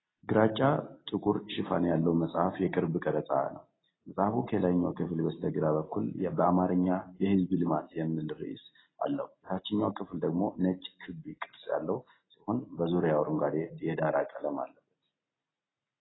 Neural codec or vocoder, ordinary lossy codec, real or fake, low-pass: none; AAC, 16 kbps; real; 7.2 kHz